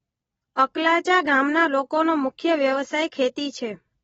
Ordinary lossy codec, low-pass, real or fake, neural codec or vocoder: AAC, 24 kbps; 19.8 kHz; real; none